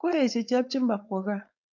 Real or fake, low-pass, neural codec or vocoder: fake; 7.2 kHz; codec, 16 kHz, 4.8 kbps, FACodec